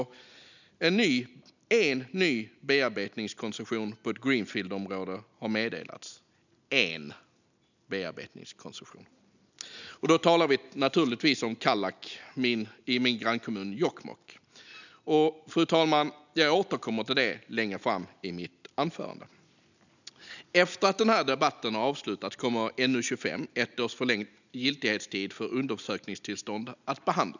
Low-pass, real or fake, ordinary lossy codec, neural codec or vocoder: 7.2 kHz; real; none; none